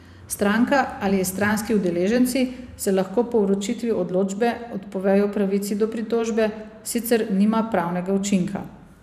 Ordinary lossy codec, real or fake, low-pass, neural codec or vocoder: none; fake; 14.4 kHz; vocoder, 44.1 kHz, 128 mel bands every 512 samples, BigVGAN v2